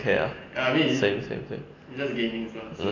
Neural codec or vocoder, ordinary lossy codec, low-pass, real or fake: none; AAC, 32 kbps; 7.2 kHz; real